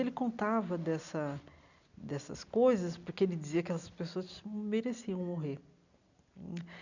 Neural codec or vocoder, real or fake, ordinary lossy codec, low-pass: none; real; none; 7.2 kHz